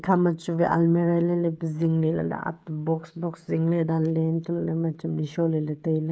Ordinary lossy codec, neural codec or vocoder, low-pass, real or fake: none; codec, 16 kHz, 16 kbps, FreqCodec, smaller model; none; fake